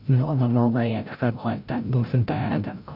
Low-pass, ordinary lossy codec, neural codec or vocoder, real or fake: 5.4 kHz; none; codec, 16 kHz, 0.5 kbps, FreqCodec, larger model; fake